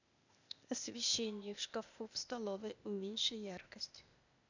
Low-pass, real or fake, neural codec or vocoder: 7.2 kHz; fake; codec, 16 kHz, 0.8 kbps, ZipCodec